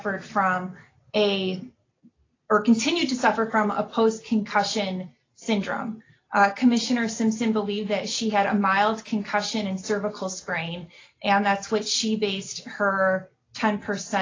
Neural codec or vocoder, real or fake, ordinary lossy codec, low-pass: none; real; AAC, 32 kbps; 7.2 kHz